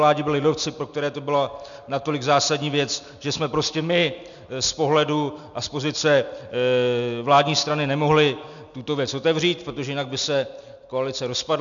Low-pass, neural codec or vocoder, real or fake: 7.2 kHz; none; real